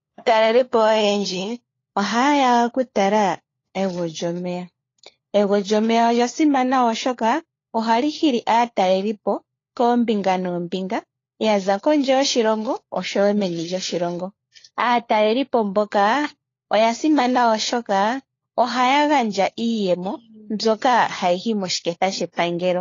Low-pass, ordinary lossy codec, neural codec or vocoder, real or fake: 7.2 kHz; AAC, 32 kbps; codec, 16 kHz, 4 kbps, FunCodec, trained on LibriTTS, 50 frames a second; fake